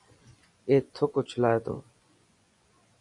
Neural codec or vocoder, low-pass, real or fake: vocoder, 24 kHz, 100 mel bands, Vocos; 10.8 kHz; fake